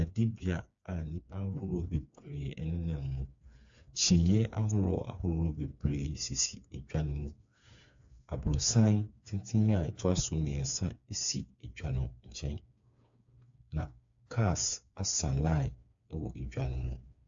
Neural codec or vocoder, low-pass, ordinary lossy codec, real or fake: codec, 16 kHz, 4 kbps, FreqCodec, smaller model; 7.2 kHz; AAC, 64 kbps; fake